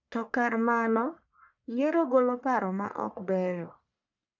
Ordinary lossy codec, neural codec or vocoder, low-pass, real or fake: none; codec, 44.1 kHz, 1.7 kbps, Pupu-Codec; 7.2 kHz; fake